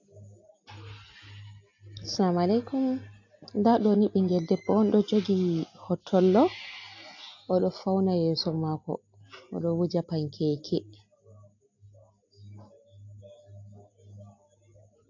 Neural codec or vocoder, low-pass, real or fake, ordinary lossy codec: none; 7.2 kHz; real; AAC, 48 kbps